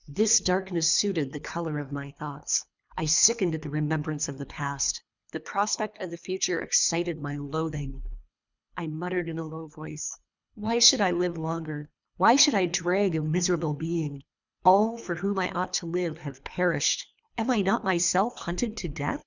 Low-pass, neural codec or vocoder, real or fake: 7.2 kHz; codec, 24 kHz, 3 kbps, HILCodec; fake